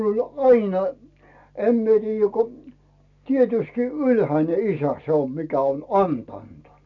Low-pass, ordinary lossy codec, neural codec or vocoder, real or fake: 7.2 kHz; none; none; real